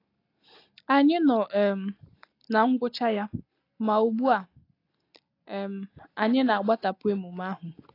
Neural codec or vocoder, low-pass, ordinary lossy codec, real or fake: none; 5.4 kHz; AAC, 32 kbps; real